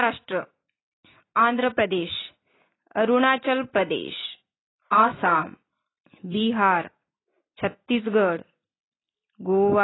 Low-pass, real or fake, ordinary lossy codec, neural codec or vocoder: 7.2 kHz; real; AAC, 16 kbps; none